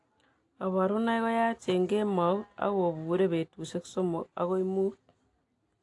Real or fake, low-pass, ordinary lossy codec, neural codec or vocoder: real; 10.8 kHz; AAC, 48 kbps; none